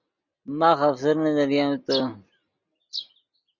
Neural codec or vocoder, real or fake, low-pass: none; real; 7.2 kHz